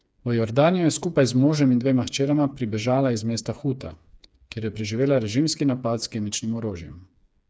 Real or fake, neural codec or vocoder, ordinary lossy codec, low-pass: fake; codec, 16 kHz, 4 kbps, FreqCodec, smaller model; none; none